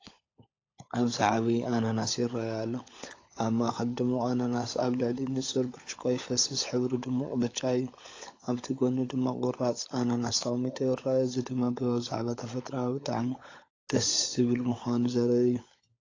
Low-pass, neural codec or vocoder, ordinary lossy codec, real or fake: 7.2 kHz; codec, 16 kHz, 16 kbps, FunCodec, trained on LibriTTS, 50 frames a second; AAC, 32 kbps; fake